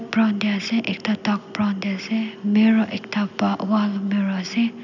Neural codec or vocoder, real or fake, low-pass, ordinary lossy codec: none; real; 7.2 kHz; none